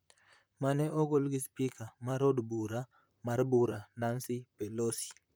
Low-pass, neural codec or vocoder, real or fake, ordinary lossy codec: none; vocoder, 44.1 kHz, 128 mel bands, Pupu-Vocoder; fake; none